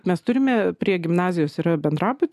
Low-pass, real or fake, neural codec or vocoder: 14.4 kHz; real; none